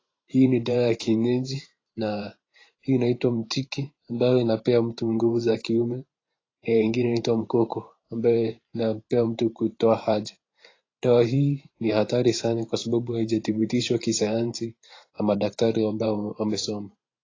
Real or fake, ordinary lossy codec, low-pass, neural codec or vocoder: fake; AAC, 32 kbps; 7.2 kHz; vocoder, 44.1 kHz, 128 mel bands every 512 samples, BigVGAN v2